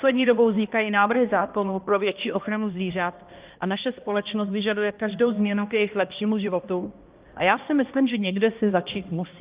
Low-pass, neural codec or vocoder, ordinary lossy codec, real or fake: 3.6 kHz; codec, 16 kHz, 1 kbps, X-Codec, HuBERT features, trained on balanced general audio; Opus, 24 kbps; fake